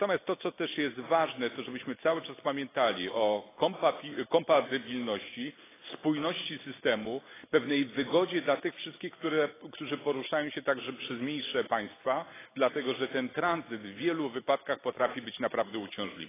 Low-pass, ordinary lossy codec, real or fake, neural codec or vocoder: 3.6 kHz; AAC, 16 kbps; real; none